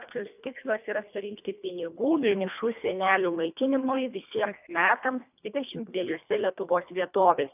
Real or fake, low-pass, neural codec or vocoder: fake; 3.6 kHz; codec, 24 kHz, 1.5 kbps, HILCodec